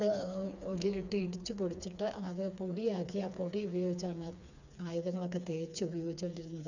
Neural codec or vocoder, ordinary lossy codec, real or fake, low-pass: codec, 16 kHz, 4 kbps, FreqCodec, smaller model; none; fake; 7.2 kHz